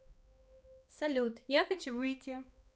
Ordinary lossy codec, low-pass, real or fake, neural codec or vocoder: none; none; fake; codec, 16 kHz, 2 kbps, X-Codec, HuBERT features, trained on balanced general audio